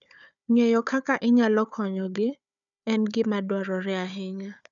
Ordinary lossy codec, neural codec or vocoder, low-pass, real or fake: none; codec, 16 kHz, 4 kbps, FunCodec, trained on Chinese and English, 50 frames a second; 7.2 kHz; fake